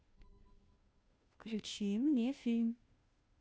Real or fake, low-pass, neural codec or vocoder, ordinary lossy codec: fake; none; codec, 16 kHz, 0.5 kbps, FunCodec, trained on Chinese and English, 25 frames a second; none